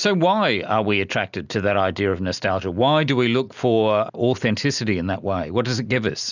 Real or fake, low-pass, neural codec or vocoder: real; 7.2 kHz; none